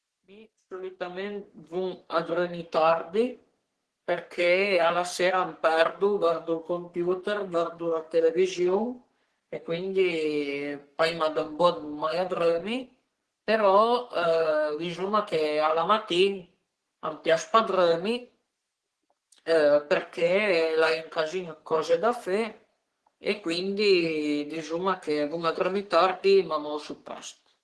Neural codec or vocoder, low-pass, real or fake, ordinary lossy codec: codec, 44.1 kHz, 3.4 kbps, Pupu-Codec; 10.8 kHz; fake; Opus, 16 kbps